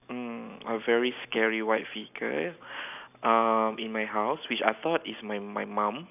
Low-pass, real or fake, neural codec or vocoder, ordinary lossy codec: 3.6 kHz; real; none; none